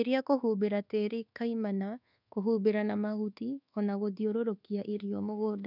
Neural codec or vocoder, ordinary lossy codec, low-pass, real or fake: codec, 24 kHz, 1.2 kbps, DualCodec; none; 5.4 kHz; fake